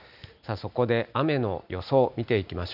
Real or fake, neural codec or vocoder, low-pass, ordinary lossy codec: real; none; 5.4 kHz; none